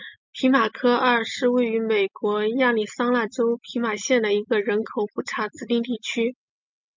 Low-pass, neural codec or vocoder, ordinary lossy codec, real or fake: 7.2 kHz; none; MP3, 64 kbps; real